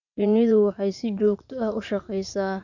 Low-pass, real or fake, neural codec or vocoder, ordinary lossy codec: 7.2 kHz; fake; vocoder, 44.1 kHz, 80 mel bands, Vocos; none